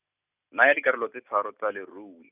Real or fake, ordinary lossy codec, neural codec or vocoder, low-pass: real; none; none; 3.6 kHz